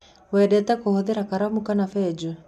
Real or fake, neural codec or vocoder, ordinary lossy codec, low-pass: real; none; MP3, 96 kbps; 14.4 kHz